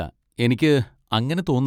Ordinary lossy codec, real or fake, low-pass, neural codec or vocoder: none; real; 19.8 kHz; none